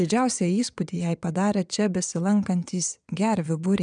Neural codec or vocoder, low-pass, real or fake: vocoder, 22.05 kHz, 80 mel bands, WaveNeXt; 9.9 kHz; fake